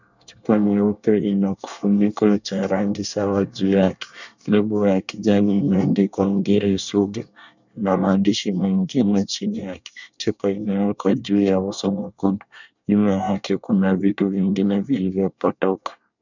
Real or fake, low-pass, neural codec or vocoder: fake; 7.2 kHz; codec, 24 kHz, 1 kbps, SNAC